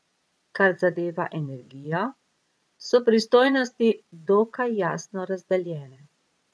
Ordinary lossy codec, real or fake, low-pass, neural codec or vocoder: none; fake; none; vocoder, 22.05 kHz, 80 mel bands, Vocos